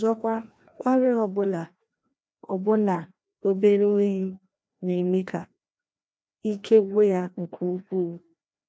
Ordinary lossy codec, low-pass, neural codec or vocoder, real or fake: none; none; codec, 16 kHz, 1 kbps, FreqCodec, larger model; fake